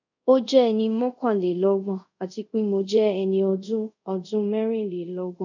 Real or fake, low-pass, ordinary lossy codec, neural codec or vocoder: fake; 7.2 kHz; none; codec, 24 kHz, 0.5 kbps, DualCodec